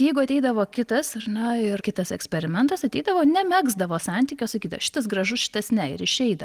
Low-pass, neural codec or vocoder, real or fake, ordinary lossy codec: 14.4 kHz; none; real; Opus, 24 kbps